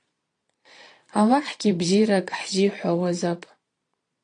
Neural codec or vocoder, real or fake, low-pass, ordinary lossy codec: vocoder, 22.05 kHz, 80 mel bands, Vocos; fake; 9.9 kHz; AAC, 48 kbps